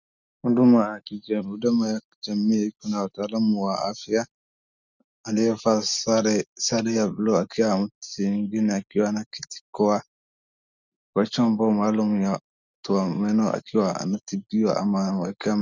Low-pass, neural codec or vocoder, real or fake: 7.2 kHz; none; real